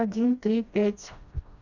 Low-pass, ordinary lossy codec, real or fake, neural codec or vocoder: 7.2 kHz; none; fake; codec, 16 kHz, 1 kbps, FreqCodec, smaller model